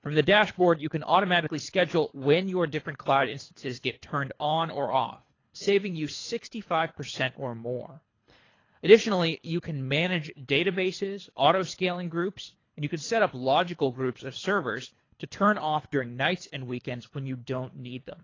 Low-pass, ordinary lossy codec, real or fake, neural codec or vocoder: 7.2 kHz; AAC, 32 kbps; fake; codec, 24 kHz, 3 kbps, HILCodec